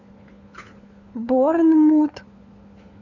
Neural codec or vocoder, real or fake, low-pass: codec, 16 kHz, 4 kbps, FunCodec, trained on LibriTTS, 50 frames a second; fake; 7.2 kHz